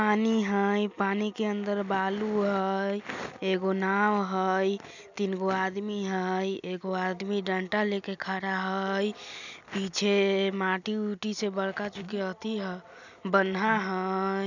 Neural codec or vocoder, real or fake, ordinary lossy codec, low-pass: none; real; none; 7.2 kHz